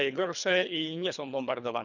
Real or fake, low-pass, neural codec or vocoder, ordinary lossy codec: fake; 7.2 kHz; codec, 24 kHz, 3 kbps, HILCodec; none